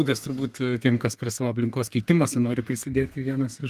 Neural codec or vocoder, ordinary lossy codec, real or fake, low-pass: codec, 32 kHz, 1.9 kbps, SNAC; Opus, 32 kbps; fake; 14.4 kHz